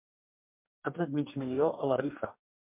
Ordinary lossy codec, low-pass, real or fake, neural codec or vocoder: MP3, 32 kbps; 3.6 kHz; fake; codec, 44.1 kHz, 2.6 kbps, DAC